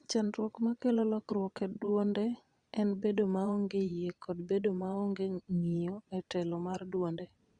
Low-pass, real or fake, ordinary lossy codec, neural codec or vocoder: 9.9 kHz; fake; Opus, 64 kbps; vocoder, 22.05 kHz, 80 mel bands, Vocos